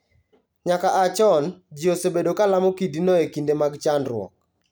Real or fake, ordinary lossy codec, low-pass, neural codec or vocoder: real; none; none; none